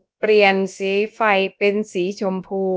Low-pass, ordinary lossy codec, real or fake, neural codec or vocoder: none; none; fake; codec, 16 kHz, about 1 kbps, DyCAST, with the encoder's durations